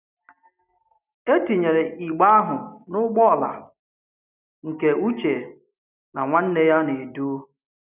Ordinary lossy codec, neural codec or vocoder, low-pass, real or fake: none; none; 3.6 kHz; real